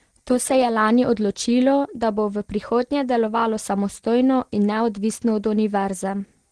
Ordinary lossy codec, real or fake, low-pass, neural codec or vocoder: Opus, 16 kbps; real; 9.9 kHz; none